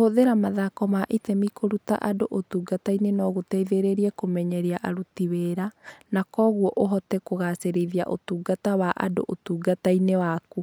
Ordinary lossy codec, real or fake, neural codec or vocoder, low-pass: none; real; none; none